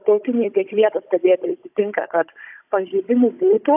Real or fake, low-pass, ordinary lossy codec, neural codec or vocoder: fake; 3.6 kHz; AAC, 32 kbps; codec, 16 kHz, 16 kbps, FunCodec, trained on Chinese and English, 50 frames a second